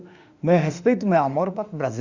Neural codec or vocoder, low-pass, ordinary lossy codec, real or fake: autoencoder, 48 kHz, 32 numbers a frame, DAC-VAE, trained on Japanese speech; 7.2 kHz; Opus, 64 kbps; fake